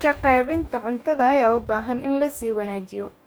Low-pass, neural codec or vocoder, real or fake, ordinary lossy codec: none; codec, 44.1 kHz, 2.6 kbps, DAC; fake; none